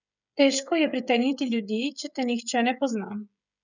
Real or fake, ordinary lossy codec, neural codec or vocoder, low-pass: fake; none; codec, 16 kHz, 16 kbps, FreqCodec, smaller model; 7.2 kHz